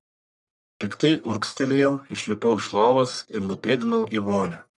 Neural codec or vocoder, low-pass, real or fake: codec, 44.1 kHz, 1.7 kbps, Pupu-Codec; 10.8 kHz; fake